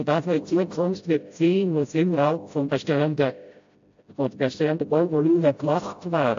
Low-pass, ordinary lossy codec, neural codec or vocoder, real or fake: 7.2 kHz; AAC, 48 kbps; codec, 16 kHz, 0.5 kbps, FreqCodec, smaller model; fake